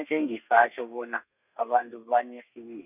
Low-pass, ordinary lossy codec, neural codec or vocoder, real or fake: 3.6 kHz; none; codec, 32 kHz, 1.9 kbps, SNAC; fake